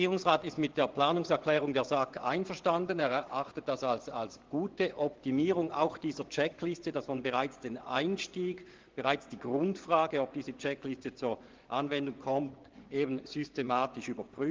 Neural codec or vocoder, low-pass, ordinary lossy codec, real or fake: vocoder, 24 kHz, 100 mel bands, Vocos; 7.2 kHz; Opus, 16 kbps; fake